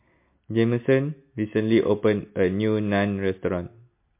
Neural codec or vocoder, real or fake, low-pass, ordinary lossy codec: none; real; 3.6 kHz; MP3, 24 kbps